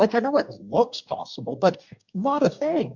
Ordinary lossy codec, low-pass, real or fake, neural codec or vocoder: MP3, 64 kbps; 7.2 kHz; fake; codec, 44.1 kHz, 2.6 kbps, DAC